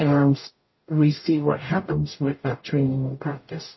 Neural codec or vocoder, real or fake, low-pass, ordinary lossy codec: codec, 44.1 kHz, 0.9 kbps, DAC; fake; 7.2 kHz; MP3, 24 kbps